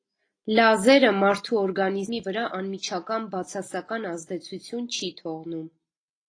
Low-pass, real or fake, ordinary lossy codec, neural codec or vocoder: 9.9 kHz; real; AAC, 32 kbps; none